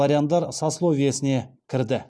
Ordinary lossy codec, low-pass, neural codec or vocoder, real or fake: none; none; none; real